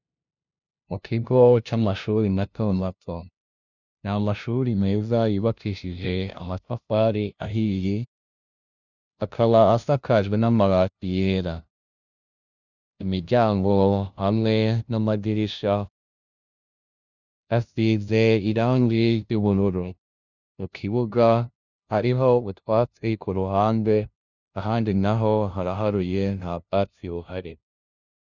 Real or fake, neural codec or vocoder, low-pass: fake; codec, 16 kHz, 0.5 kbps, FunCodec, trained on LibriTTS, 25 frames a second; 7.2 kHz